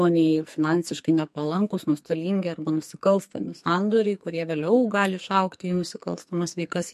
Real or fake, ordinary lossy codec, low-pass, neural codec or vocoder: fake; MP3, 64 kbps; 14.4 kHz; codec, 44.1 kHz, 2.6 kbps, SNAC